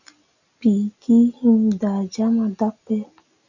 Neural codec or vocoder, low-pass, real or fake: none; 7.2 kHz; real